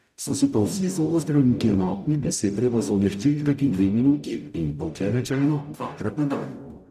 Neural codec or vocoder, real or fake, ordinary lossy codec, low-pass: codec, 44.1 kHz, 0.9 kbps, DAC; fake; none; 14.4 kHz